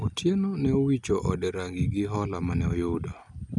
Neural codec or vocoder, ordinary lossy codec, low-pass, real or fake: none; none; 10.8 kHz; real